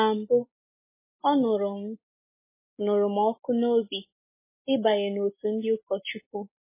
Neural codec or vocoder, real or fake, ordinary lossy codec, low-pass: none; real; MP3, 16 kbps; 3.6 kHz